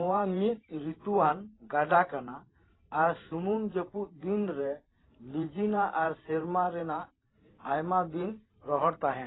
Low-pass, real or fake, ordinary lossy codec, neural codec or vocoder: 7.2 kHz; fake; AAC, 16 kbps; vocoder, 44.1 kHz, 128 mel bands every 512 samples, BigVGAN v2